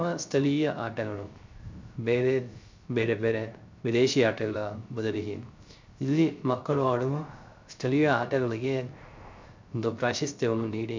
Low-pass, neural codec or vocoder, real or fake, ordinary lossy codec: 7.2 kHz; codec, 16 kHz, 0.3 kbps, FocalCodec; fake; MP3, 64 kbps